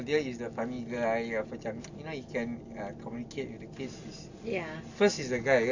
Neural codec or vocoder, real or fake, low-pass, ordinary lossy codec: none; real; 7.2 kHz; none